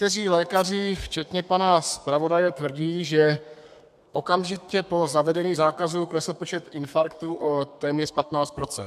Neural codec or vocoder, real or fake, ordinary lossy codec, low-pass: codec, 32 kHz, 1.9 kbps, SNAC; fake; AAC, 96 kbps; 14.4 kHz